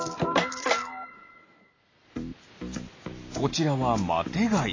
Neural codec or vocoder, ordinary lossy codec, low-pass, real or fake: none; AAC, 32 kbps; 7.2 kHz; real